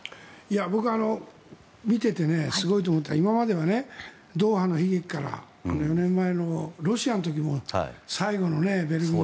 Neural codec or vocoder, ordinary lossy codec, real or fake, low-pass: none; none; real; none